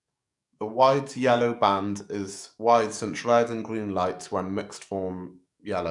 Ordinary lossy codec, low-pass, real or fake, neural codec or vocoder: none; 10.8 kHz; fake; codec, 44.1 kHz, 7.8 kbps, DAC